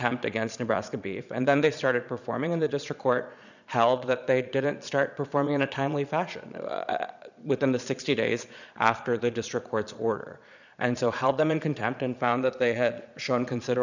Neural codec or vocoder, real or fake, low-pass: vocoder, 22.05 kHz, 80 mel bands, Vocos; fake; 7.2 kHz